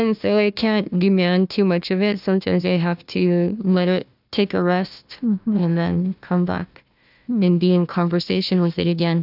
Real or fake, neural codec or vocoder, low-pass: fake; codec, 16 kHz, 1 kbps, FunCodec, trained on Chinese and English, 50 frames a second; 5.4 kHz